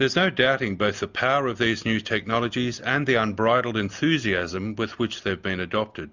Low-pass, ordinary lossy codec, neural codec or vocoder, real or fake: 7.2 kHz; Opus, 64 kbps; none; real